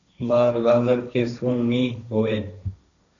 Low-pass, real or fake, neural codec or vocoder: 7.2 kHz; fake; codec, 16 kHz, 1.1 kbps, Voila-Tokenizer